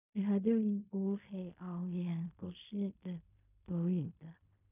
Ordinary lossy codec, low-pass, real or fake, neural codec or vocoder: none; 3.6 kHz; fake; codec, 16 kHz in and 24 kHz out, 0.4 kbps, LongCat-Audio-Codec, fine tuned four codebook decoder